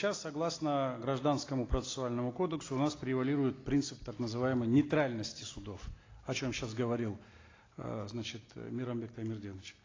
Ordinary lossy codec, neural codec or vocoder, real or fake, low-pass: AAC, 32 kbps; none; real; 7.2 kHz